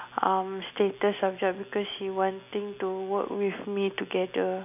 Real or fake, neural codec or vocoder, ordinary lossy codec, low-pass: real; none; none; 3.6 kHz